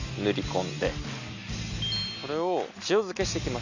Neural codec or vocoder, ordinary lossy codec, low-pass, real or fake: none; none; 7.2 kHz; real